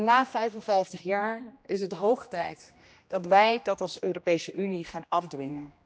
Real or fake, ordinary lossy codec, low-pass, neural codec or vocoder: fake; none; none; codec, 16 kHz, 1 kbps, X-Codec, HuBERT features, trained on general audio